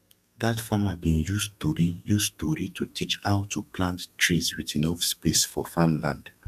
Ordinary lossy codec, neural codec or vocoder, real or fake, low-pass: none; codec, 32 kHz, 1.9 kbps, SNAC; fake; 14.4 kHz